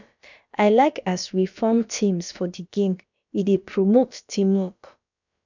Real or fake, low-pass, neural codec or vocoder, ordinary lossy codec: fake; 7.2 kHz; codec, 16 kHz, about 1 kbps, DyCAST, with the encoder's durations; none